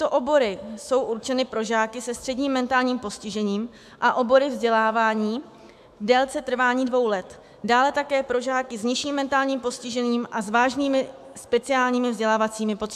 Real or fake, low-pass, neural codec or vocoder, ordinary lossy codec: fake; 14.4 kHz; autoencoder, 48 kHz, 128 numbers a frame, DAC-VAE, trained on Japanese speech; AAC, 96 kbps